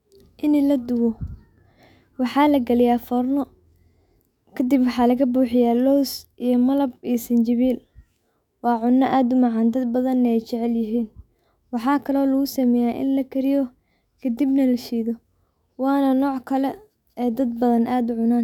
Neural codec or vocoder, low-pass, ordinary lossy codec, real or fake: autoencoder, 48 kHz, 128 numbers a frame, DAC-VAE, trained on Japanese speech; 19.8 kHz; none; fake